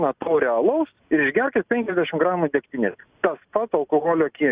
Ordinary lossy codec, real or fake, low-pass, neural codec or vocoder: Opus, 64 kbps; real; 3.6 kHz; none